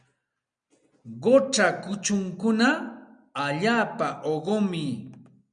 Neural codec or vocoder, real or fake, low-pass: none; real; 9.9 kHz